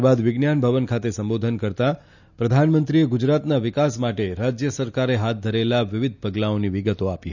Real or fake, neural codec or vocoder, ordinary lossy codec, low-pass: real; none; none; 7.2 kHz